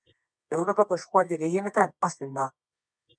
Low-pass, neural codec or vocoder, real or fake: 9.9 kHz; codec, 24 kHz, 0.9 kbps, WavTokenizer, medium music audio release; fake